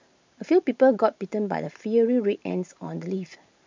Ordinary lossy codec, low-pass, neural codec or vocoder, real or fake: MP3, 64 kbps; 7.2 kHz; vocoder, 44.1 kHz, 128 mel bands every 512 samples, BigVGAN v2; fake